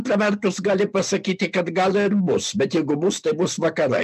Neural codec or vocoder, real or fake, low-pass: none; real; 14.4 kHz